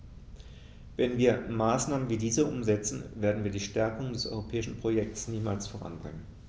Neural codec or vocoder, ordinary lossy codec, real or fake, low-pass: none; none; real; none